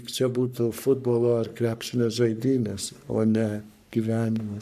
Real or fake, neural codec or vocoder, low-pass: fake; codec, 44.1 kHz, 3.4 kbps, Pupu-Codec; 14.4 kHz